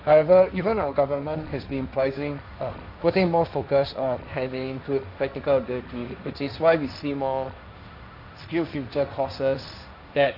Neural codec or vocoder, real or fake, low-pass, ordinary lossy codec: codec, 16 kHz, 1.1 kbps, Voila-Tokenizer; fake; 5.4 kHz; none